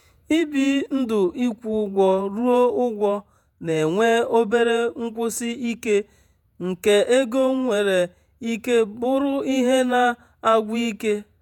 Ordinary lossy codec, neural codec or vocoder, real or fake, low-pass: none; vocoder, 48 kHz, 128 mel bands, Vocos; fake; 19.8 kHz